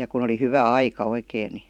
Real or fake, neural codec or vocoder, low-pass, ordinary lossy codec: fake; vocoder, 44.1 kHz, 128 mel bands every 512 samples, BigVGAN v2; 19.8 kHz; none